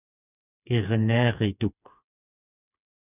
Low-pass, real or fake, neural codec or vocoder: 3.6 kHz; fake; codec, 16 kHz, 4 kbps, FreqCodec, smaller model